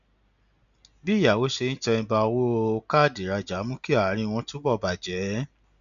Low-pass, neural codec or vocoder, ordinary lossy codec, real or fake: 7.2 kHz; none; none; real